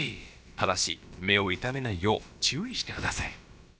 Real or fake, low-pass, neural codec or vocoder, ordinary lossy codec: fake; none; codec, 16 kHz, about 1 kbps, DyCAST, with the encoder's durations; none